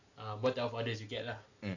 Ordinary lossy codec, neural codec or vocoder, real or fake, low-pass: none; none; real; 7.2 kHz